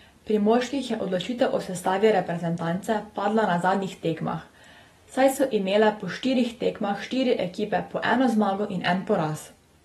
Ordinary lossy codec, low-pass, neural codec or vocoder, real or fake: AAC, 32 kbps; 14.4 kHz; none; real